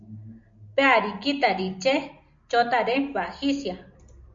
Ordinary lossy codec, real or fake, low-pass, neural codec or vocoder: MP3, 48 kbps; real; 7.2 kHz; none